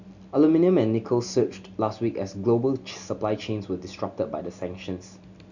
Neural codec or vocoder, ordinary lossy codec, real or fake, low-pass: none; none; real; 7.2 kHz